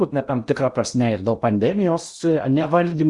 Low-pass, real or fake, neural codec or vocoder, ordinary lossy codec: 10.8 kHz; fake; codec, 16 kHz in and 24 kHz out, 0.8 kbps, FocalCodec, streaming, 65536 codes; Opus, 64 kbps